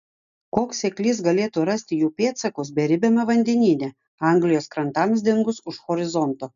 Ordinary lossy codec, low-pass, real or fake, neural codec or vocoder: MP3, 96 kbps; 7.2 kHz; real; none